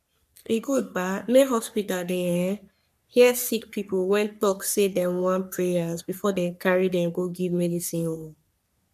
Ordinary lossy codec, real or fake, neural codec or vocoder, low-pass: none; fake; codec, 44.1 kHz, 3.4 kbps, Pupu-Codec; 14.4 kHz